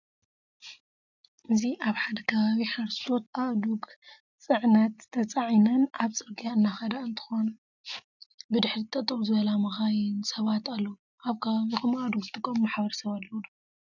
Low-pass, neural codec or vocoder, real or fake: 7.2 kHz; none; real